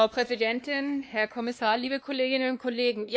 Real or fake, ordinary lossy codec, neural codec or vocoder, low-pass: fake; none; codec, 16 kHz, 2 kbps, X-Codec, WavLM features, trained on Multilingual LibriSpeech; none